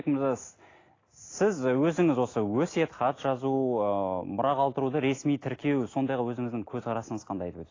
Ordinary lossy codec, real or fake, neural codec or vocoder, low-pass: AAC, 32 kbps; real; none; 7.2 kHz